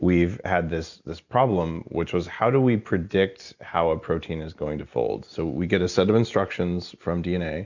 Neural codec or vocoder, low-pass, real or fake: none; 7.2 kHz; real